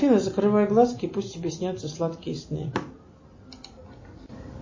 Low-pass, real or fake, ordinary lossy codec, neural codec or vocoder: 7.2 kHz; real; MP3, 32 kbps; none